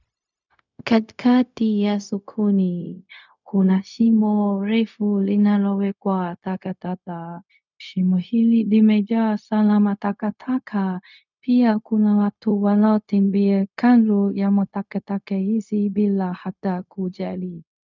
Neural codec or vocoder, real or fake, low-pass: codec, 16 kHz, 0.4 kbps, LongCat-Audio-Codec; fake; 7.2 kHz